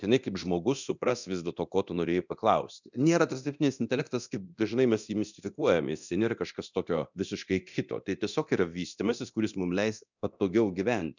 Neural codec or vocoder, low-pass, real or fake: codec, 24 kHz, 0.9 kbps, DualCodec; 7.2 kHz; fake